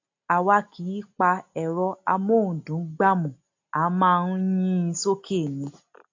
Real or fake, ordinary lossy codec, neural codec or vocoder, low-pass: real; AAC, 48 kbps; none; 7.2 kHz